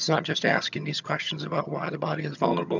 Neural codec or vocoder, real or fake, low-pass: vocoder, 22.05 kHz, 80 mel bands, HiFi-GAN; fake; 7.2 kHz